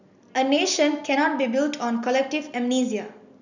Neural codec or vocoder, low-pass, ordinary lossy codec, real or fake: none; 7.2 kHz; none; real